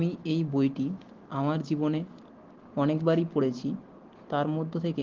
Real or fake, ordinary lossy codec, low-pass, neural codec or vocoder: real; Opus, 16 kbps; 7.2 kHz; none